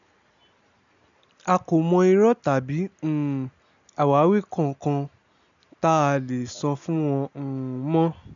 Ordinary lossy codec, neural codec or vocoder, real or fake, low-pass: none; none; real; 7.2 kHz